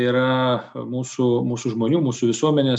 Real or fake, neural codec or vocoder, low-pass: real; none; 9.9 kHz